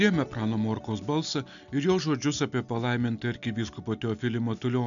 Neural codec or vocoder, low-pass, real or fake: none; 7.2 kHz; real